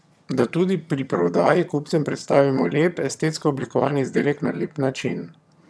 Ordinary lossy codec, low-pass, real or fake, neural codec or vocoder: none; none; fake; vocoder, 22.05 kHz, 80 mel bands, HiFi-GAN